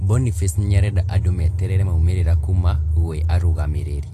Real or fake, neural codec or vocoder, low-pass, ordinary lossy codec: real; none; 14.4 kHz; AAC, 48 kbps